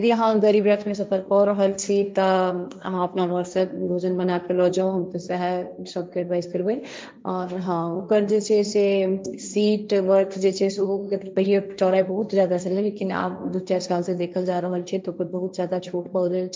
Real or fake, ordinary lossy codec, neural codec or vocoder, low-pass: fake; none; codec, 16 kHz, 1.1 kbps, Voila-Tokenizer; none